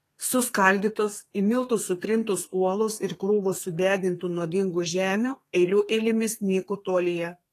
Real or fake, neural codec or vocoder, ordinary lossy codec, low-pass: fake; codec, 32 kHz, 1.9 kbps, SNAC; AAC, 48 kbps; 14.4 kHz